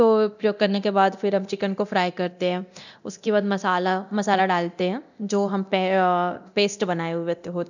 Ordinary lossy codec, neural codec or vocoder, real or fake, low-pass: none; codec, 24 kHz, 0.9 kbps, DualCodec; fake; 7.2 kHz